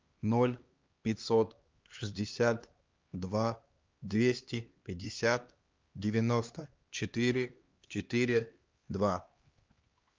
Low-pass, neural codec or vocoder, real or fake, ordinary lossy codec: 7.2 kHz; codec, 16 kHz, 2 kbps, X-Codec, HuBERT features, trained on LibriSpeech; fake; Opus, 24 kbps